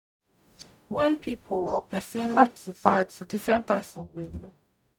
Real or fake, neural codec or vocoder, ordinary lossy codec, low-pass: fake; codec, 44.1 kHz, 0.9 kbps, DAC; none; 19.8 kHz